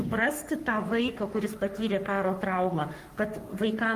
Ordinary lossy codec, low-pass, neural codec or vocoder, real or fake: Opus, 32 kbps; 14.4 kHz; codec, 44.1 kHz, 3.4 kbps, Pupu-Codec; fake